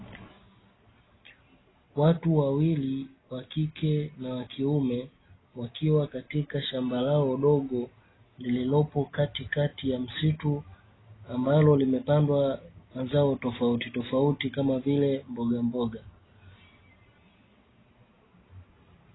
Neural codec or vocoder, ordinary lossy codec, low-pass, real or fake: none; AAC, 16 kbps; 7.2 kHz; real